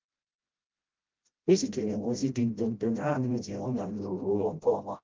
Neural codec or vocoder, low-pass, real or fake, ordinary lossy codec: codec, 16 kHz, 0.5 kbps, FreqCodec, smaller model; 7.2 kHz; fake; Opus, 32 kbps